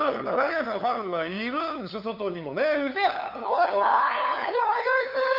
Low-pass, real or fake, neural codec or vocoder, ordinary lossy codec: 5.4 kHz; fake; codec, 16 kHz, 2 kbps, FunCodec, trained on LibriTTS, 25 frames a second; none